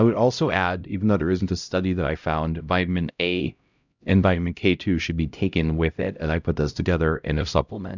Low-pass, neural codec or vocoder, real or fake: 7.2 kHz; codec, 16 kHz, 0.5 kbps, X-Codec, HuBERT features, trained on LibriSpeech; fake